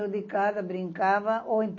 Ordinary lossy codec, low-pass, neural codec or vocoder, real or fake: MP3, 32 kbps; 7.2 kHz; none; real